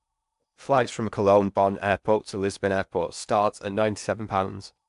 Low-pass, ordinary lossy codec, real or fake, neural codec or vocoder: 10.8 kHz; MP3, 96 kbps; fake; codec, 16 kHz in and 24 kHz out, 0.8 kbps, FocalCodec, streaming, 65536 codes